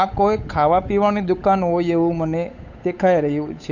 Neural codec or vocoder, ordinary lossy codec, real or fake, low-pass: codec, 16 kHz, 8 kbps, FunCodec, trained on Chinese and English, 25 frames a second; none; fake; 7.2 kHz